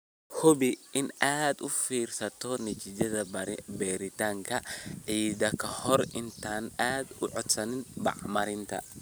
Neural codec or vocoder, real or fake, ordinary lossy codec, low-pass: none; real; none; none